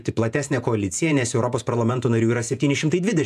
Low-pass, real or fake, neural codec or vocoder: 14.4 kHz; fake; vocoder, 48 kHz, 128 mel bands, Vocos